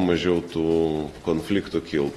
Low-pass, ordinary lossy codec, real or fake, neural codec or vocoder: 19.8 kHz; AAC, 32 kbps; real; none